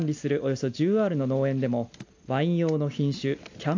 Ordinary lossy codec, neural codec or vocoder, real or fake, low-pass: AAC, 48 kbps; none; real; 7.2 kHz